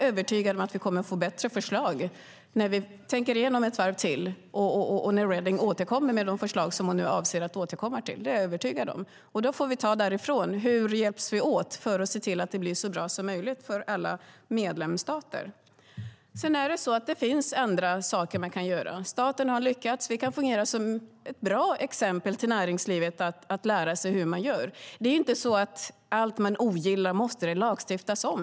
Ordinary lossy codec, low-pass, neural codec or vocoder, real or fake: none; none; none; real